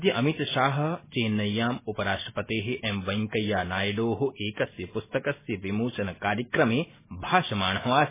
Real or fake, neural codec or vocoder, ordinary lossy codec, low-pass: real; none; MP3, 16 kbps; 3.6 kHz